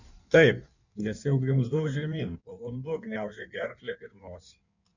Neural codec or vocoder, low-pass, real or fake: codec, 16 kHz in and 24 kHz out, 1.1 kbps, FireRedTTS-2 codec; 7.2 kHz; fake